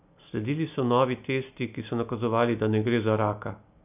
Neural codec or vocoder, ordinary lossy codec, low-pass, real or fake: none; none; 3.6 kHz; real